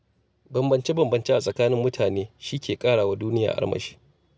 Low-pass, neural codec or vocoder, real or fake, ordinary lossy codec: none; none; real; none